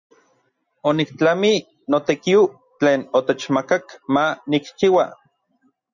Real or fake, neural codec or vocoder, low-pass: real; none; 7.2 kHz